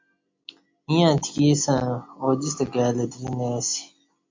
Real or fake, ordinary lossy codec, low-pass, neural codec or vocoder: real; MP3, 48 kbps; 7.2 kHz; none